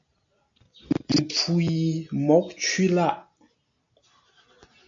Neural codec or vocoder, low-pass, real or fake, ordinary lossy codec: none; 7.2 kHz; real; MP3, 48 kbps